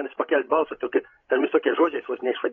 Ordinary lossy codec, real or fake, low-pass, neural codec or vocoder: AAC, 32 kbps; fake; 7.2 kHz; codec, 16 kHz, 16 kbps, FreqCodec, larger model